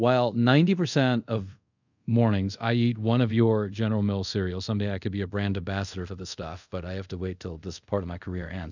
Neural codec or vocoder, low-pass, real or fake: codec, 24 kHz, 0.5 kbps, DualCodec; 7.2 kHz; fake